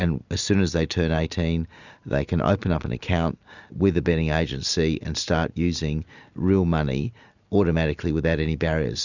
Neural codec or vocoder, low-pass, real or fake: none; 7.2 kHz; real